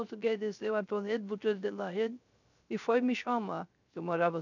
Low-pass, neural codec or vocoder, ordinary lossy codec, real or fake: 7.2 kHz; codec, 16 kHz, 0.3 kbps, FocalCodec; none; fake